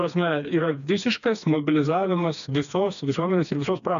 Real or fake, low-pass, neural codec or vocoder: fake; 7.2 kHz; codec, 16 kHz, 2 kbps, FreqCodec, smaller model